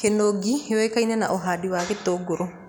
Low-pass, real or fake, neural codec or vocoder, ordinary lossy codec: none; real; none; none